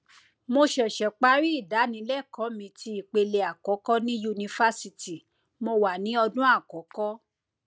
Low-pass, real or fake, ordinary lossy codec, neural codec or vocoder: none; real; none; none